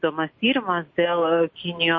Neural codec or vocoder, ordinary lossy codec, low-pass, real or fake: none; MP3, 32 kbps; 7.2 kHz; real